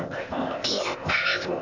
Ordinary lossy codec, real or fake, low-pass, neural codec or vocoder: none; fake; 7.2 kHz; codec, 16 kHz, 0.8 kbps, ZipCodec